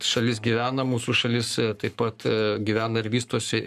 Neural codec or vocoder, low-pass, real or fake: codec, 44.1 kHz, 7.8 kbps, Pupu-Codec; 14.4 kHz; fake